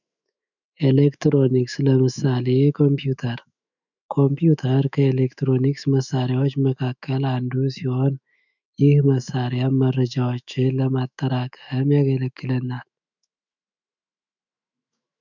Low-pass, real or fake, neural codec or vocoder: 7.2 kHz; fake; autoencoder, 48 kHz, 128 numbers a frame, DAC-VAE, trained on Japanese speech